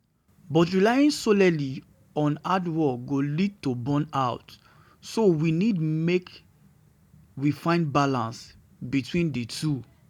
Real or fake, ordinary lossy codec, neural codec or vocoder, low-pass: real; none; none; 19.8 kHz